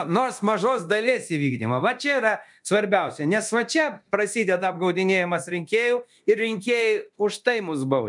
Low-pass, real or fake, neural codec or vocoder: 10.8 kHz; fake; codec, 24 kHz, 0.9 kbps, DualCodec